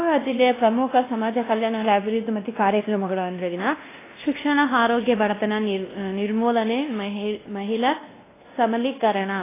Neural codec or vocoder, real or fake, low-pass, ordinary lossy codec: codec, 16 kHz, 1 kbps, X-Codec, WavLM features, trained on Multilingual LibriSpeech; fake; 3.6 kHz; AAC, 16 kbps